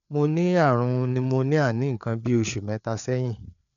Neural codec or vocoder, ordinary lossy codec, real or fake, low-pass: codec, 16 kHz, 4 kbps, FreqCodec, larger model; none; fake; 7.2 kHz